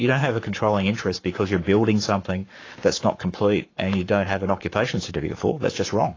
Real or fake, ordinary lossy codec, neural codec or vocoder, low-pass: fake; AAC, 32 kbps; codec, 44.1 kHz, 7.8 kbps, Pupu-Codec; 7.2 kHz